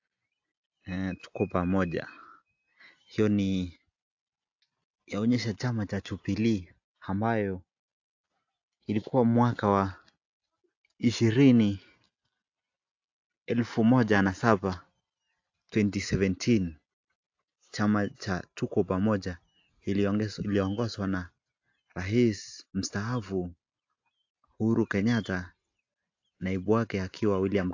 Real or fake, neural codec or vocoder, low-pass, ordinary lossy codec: real; none; 7.2 kHz; AAC, 48 kbps